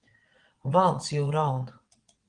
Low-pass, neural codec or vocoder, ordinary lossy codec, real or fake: 9.9 kHz; vocoder, 22.05 kHz, 80 mel bands, WaveNeXt; Opus, 24 kbps; fake